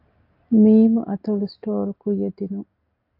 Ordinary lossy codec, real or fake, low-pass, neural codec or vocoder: AAC, 32 kbps; real; 5.4 kHz; none